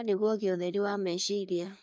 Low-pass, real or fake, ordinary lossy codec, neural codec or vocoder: none; fake; none; codec, 16 kHz, 2 kbps, FreqCodec, larger model